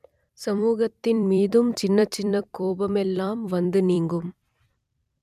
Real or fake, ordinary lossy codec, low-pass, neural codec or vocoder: fake; none; 14.4 kHz; vocoder, 44.1 kHz, 128 mel bands every 256 samples, BigVGAN v2